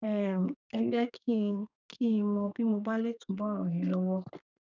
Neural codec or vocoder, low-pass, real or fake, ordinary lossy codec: codec, 32 kHz, 1.9 kbps, SNAC; 7.2 kHz; fake; none